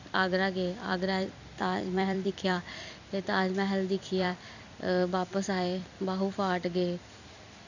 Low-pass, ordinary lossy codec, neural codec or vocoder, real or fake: 7.2 kHz; none; vocoder, 44.1 kHz, 128 mel bands every 256 samples, BigVGAN v2; fake